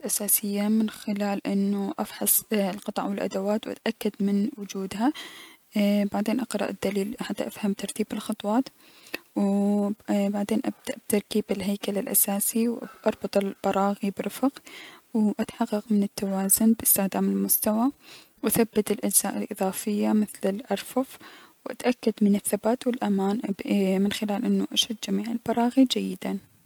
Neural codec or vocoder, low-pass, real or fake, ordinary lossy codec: none; 19.8 kHz; real; MP3, 96 kbps